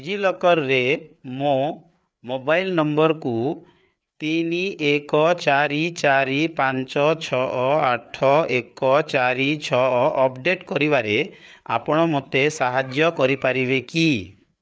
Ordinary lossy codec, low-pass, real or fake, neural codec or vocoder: none; none; fake; codec, 16 kHz, 4 kbps, FreqCodec, larger model